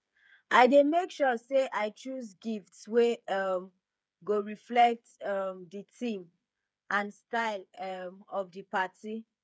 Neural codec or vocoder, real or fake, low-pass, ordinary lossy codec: codec, 16 kHz, 8 kbps, FreqCodec, smaller model; fake; none; none